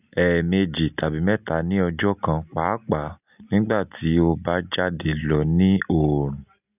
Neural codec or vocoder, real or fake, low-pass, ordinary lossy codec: none; real; 3.6 kHz; none